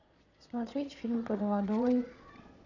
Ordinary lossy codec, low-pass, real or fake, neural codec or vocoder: none; 7.2 kHz; fake; vocoder, 22.05 kHz, 80 mel bands, Vocos